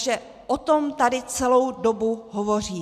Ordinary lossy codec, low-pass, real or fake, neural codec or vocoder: MP3, 96 kbps; 14.4 kHz; real; none